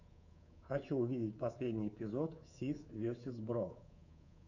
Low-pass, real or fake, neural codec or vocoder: 7.2 kHz; fake; codec, 24 kHz, 3.1 kbps, DualCodec